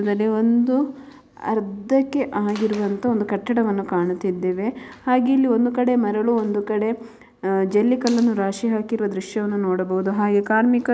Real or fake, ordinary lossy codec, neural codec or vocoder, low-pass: real; none; none; none